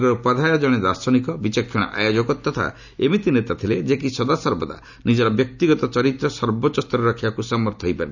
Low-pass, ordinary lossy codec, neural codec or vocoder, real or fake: 7.2 kHz; none; none; real